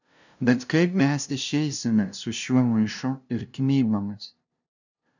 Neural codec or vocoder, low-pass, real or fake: codec, 16 kHz, 0.5 kbps, FunCodec, trained on LibriTTS, 25 frames a second; 7.2 kHz; fake